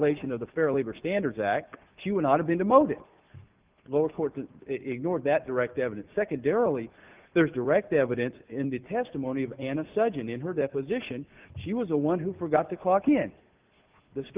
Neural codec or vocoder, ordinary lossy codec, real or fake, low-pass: none; Opus, 24 kbps; real; 3.6 kHz